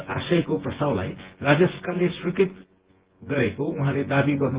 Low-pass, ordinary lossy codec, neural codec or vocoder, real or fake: 3.6 kHz; Opus, 16 kbps; vocoder, 24 kHz, 100 mel bands, Vocos; fake